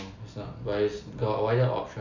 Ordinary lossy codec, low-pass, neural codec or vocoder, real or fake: none; 7.2 kHz; none; real